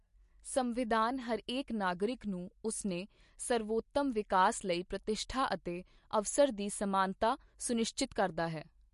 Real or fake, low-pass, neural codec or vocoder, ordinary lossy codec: real; 14.4 kHz; none; MP3, 48 kbps